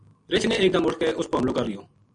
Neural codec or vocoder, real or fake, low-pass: none; real; 9.9 kHz